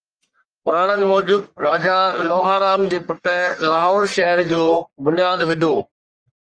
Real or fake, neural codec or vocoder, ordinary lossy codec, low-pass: fake; codec, 44.1 kHz, 1.7 kbps, Pupu-Codec; Opus, 32 kbps; 9.9 kHz